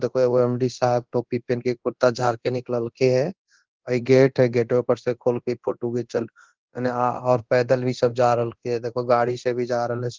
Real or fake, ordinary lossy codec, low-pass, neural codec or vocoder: fake; Opus, 16 kbps; 7.2 kHz; codec, 24 kHz, 0.9 kbps, DualCodec